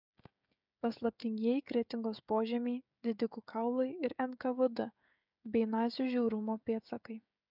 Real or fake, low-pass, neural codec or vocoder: fake; 5.4 kHz; codec, 16 kHz, 16 kbps, FreqCodec, smaller model